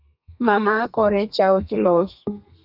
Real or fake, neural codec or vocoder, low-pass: fake; codec, 32 kHz, 1.9 kbps, SNAC; 5.4 kHz